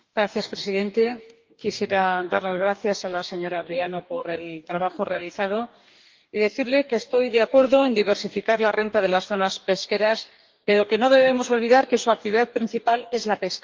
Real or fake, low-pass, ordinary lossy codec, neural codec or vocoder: fake; 7.2 kHz; Opus, 32 kbps; codec, 44.1 kHz, 2.6 kbps, DAC